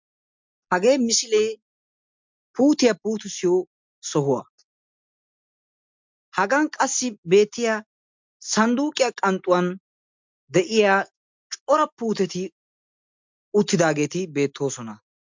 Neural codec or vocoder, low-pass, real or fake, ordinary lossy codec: none; 7.2 kHz; real; MP3, 64 kbps